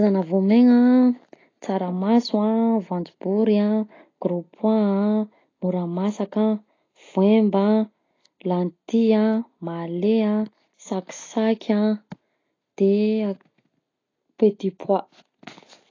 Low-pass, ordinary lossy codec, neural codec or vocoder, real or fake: 7.2 kHz; AAC, 32 kbps; none; real